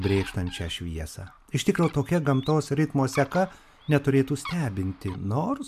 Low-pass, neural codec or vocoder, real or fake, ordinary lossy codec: 14.4 kHz; none; real; MP3, 96 kbps